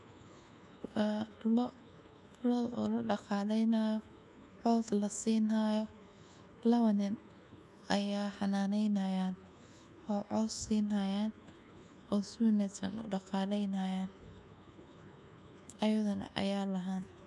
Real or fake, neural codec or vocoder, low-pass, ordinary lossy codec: fake; codec, 24 kHz, 1.2 kbps, DualCodec; none; none